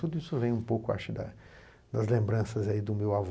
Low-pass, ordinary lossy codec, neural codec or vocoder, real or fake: none; none; none; real